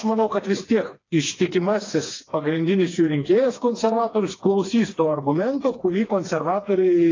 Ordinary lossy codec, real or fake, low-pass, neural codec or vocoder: AAC, 32 kbps; fake; 7.2 kHz; codec, 16 kHz, 2 kbps, FreqCodec, smaller model